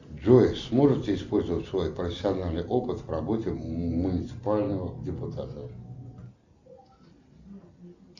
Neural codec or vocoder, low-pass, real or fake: none; 7.2 kHz; real